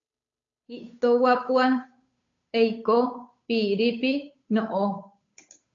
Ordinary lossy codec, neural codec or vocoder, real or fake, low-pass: AAC, 48 kbps; codec, 16 kHz, 8 kbps, FunCodec, trained on Chinese and English, 25 frames a second; fake; 7.2 kHz